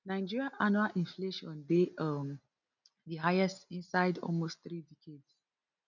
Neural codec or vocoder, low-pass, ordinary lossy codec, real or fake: none; 7.2 kHz; none; real